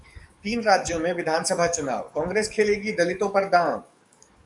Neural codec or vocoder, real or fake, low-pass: codec, 44.1 kHz, 7.8 kbps, DAC; fake; 10.8 kHz